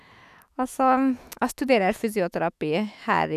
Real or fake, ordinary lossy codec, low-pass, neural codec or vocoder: fake; none; 14.4 kHz; autoencoder, 48 kHz, 128 numbers a frame, DAC-VAE, trained on Japanese speech